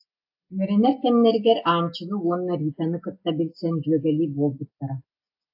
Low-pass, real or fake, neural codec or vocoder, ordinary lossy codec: 5.4 kHz; real; none; AAC, 48 kbps